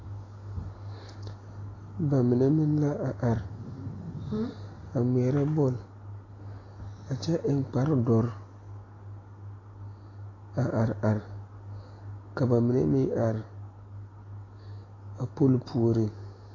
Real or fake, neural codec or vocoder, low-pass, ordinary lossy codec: real; none; 7.2 kHz; AAC, 32 kbps